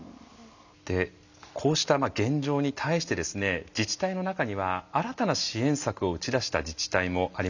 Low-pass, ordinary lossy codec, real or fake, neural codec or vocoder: 7.2 kHz; none; real; none